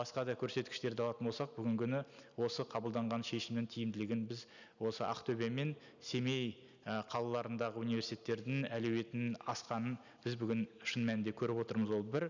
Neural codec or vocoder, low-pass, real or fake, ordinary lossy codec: none; 7.2 kHz; real; none